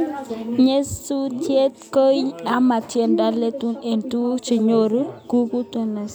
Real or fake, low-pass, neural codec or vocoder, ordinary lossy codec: real; none; none; none